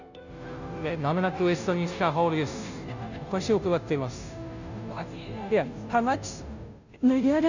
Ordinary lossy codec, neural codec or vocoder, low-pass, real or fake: none; codec, 16 kHz, 0.5 kbps, FunCodec, trained on Chinese and English, 25 frames a second; 7.2 kHz; fake